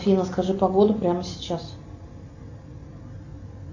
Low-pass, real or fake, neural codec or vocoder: 7.2 kHz; real; none